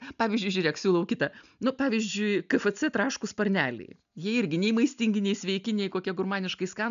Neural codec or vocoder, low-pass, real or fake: none; 7.2 kHz; real